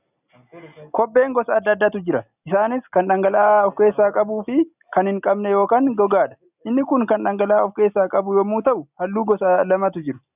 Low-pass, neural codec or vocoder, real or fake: 3.6 kHz; none; real